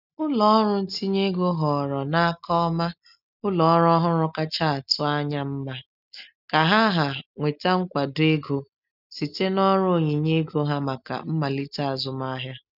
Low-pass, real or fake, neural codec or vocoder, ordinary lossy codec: 5.4 kHz; real; none; none